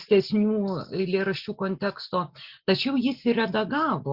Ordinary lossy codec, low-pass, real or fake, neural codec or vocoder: Opus, 64 kbps; 5.4 kHz; real; none